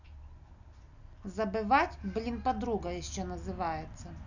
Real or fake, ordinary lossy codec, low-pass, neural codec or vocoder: real; none; 7.2 kHz; none